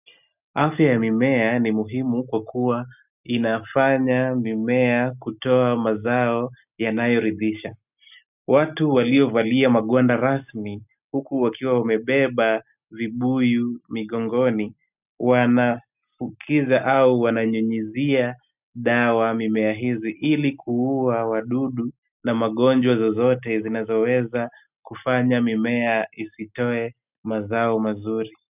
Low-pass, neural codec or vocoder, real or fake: 3.6 kHz; none; real